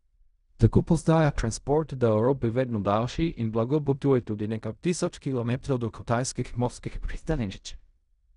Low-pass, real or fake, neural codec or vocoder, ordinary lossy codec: 10.8 kHz; fake; codec, 16 kHz in and 24 kHz out, 0.4 kbps, LongCat-Audio-Codec, fine tuned four codebook decoder; none